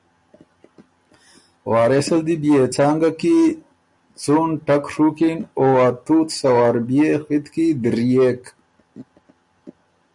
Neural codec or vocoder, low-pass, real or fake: none; 10.8 kHz; real